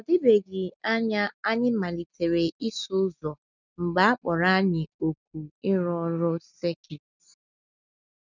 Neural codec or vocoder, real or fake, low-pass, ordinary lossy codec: none; real; 7.2 kHz; none